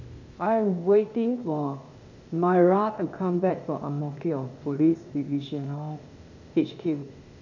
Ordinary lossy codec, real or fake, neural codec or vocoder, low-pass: none; fake; codec, 16 kHz, 0.8 kbps, ZipCodec; 7.2 kHz